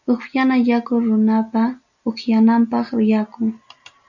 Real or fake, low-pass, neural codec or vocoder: real; 7.2 kHz; none